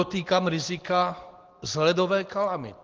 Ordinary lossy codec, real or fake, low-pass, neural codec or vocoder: Opus, 16 kbps; real; 7.2 kHz; none